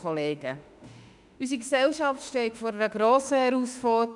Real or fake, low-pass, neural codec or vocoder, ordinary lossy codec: fake; 10.8 kHz; autoencoder, 48 kHz, 32 numbers a frame, DAC-VAE, trained on Japanese speech; none